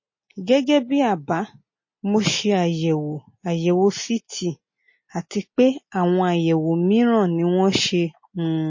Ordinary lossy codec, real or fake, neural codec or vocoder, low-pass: MP3, 32 kbps; real; none; 7.2 kHz